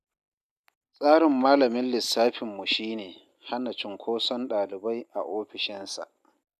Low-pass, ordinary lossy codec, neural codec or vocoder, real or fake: 14.4 kHz; none; none; real